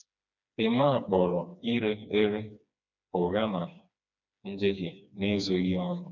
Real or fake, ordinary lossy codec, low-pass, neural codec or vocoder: fake; none; 7.2 kHz; codec, 16 kHz, 2 kbps, FreqCodec, smaller model